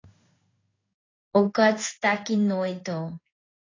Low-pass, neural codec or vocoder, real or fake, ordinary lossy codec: 7.2 kHz; codec, 16 kHz in and 24 kHz out, 1 kbps, XY-Tokenizer; fake; AAC, 32 kbps